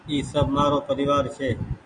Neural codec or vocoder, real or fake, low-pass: none; real; 9.9 kHz